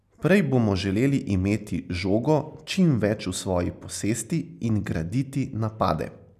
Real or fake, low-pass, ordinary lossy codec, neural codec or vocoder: real; 14.4 kHz; none; none